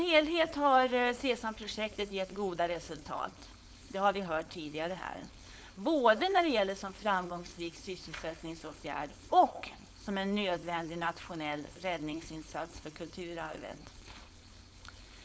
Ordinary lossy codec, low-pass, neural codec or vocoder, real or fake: none; none; codec, 16 kHz, 4.8 kbps, FACodec; fake